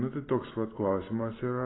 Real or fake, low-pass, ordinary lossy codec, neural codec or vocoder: real; 7.2 kHz; AAC, 16 kbps; none